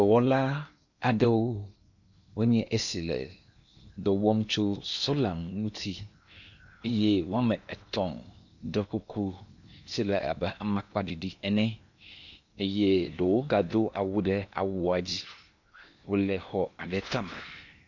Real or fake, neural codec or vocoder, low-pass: fake; codec, 16 kHz in and 24 kHz out, 0.8 kbps, FocalCodec, streaming, 65536 codes; 7.2 kHz